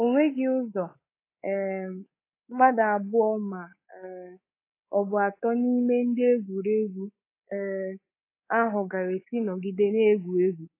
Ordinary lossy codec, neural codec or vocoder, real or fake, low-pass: AAC, 24 kbps; codec, 24 kHz, 3.1 kbps, DualCodec; fake; 3.6 kHz